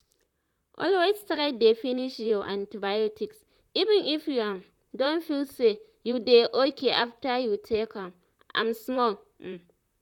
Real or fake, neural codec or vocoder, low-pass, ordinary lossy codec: fake; vocoder, 44.1 kHz, 128 mel bands, Pupu-Vocoder; 19.8 kHz; none